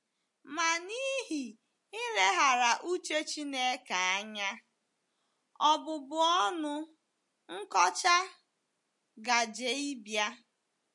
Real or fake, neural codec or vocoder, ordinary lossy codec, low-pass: real; none; MP3, 48 kbps; 10.8 kHz